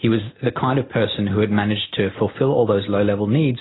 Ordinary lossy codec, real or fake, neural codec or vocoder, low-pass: AAC, 16 kbps; real; none; 7.2 kHz